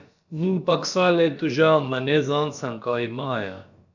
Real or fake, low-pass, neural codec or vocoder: fake; 7.2 kHz; codec, 16 kHz, about 1 kbps, DyCAST, with the encoder's durations